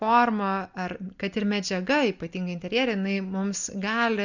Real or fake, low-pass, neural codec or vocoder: real; 7.2 kHz; none